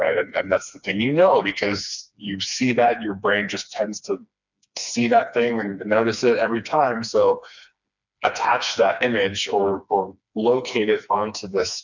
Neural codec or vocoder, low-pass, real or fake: codec, 16 kHz, 2 kbps, FreqCodec, smaller model; 7.2 kHz; fake